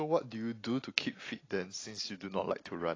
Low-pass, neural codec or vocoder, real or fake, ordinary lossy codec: 7.2 kHz; none; real; AAC, 32 kbps